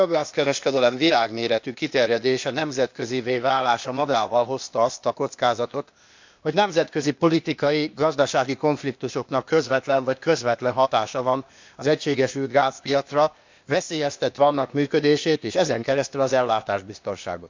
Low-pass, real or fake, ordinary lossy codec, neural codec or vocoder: 7.2 kHz; fake; MP3, 64 kbps; codec, 16 kHz, 0.8 kbps, ZipCodec